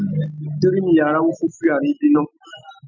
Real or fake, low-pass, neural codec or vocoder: real; 7.2 kHz; none